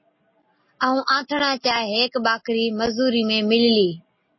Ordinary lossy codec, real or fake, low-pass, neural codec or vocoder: MP3, 24 kbps; real; 7.2 kHz; none